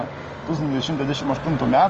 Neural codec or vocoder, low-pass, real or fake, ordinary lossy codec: none; 7.2 kHz; real; Opus, 24 kbps